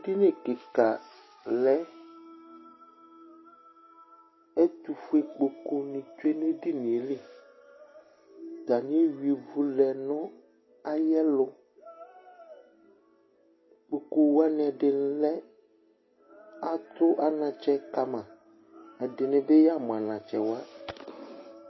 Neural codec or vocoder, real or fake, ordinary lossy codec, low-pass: none; real; MP3, 24 kbps; 7.2 kHz